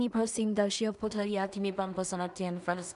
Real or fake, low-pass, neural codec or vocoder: fake; 10.8 kHz; codec, 16 kHz in and 24 kHz out, 0.4 kbps, LongCat-Audio-Codec, two codebook decoder